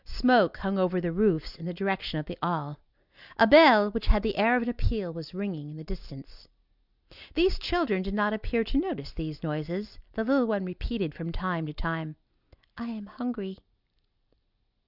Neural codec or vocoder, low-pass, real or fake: none; 5.4 kHz; real